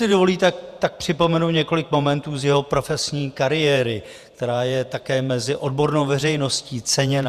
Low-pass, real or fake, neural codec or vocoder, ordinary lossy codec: 14.4 kHz; fake; vocoder, 48 kHz, 128 mel bands, Vocos; Opus, 64 kbps